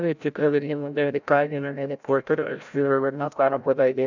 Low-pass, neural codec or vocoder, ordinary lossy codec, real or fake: 7.2 kHz; codec, 16 kHz, 0.5 kbps, FreqCodec, larger model; none; fake